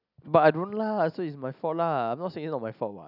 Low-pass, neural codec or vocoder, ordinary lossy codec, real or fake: 5.4 kHz; none; none; real